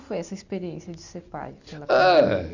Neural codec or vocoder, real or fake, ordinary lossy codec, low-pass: none; real; none; 7.2 kHz